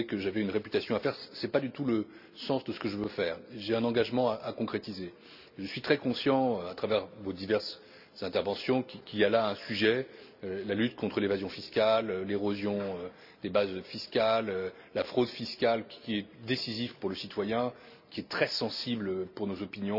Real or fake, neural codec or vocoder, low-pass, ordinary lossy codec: real; none; 5.4 kHz; none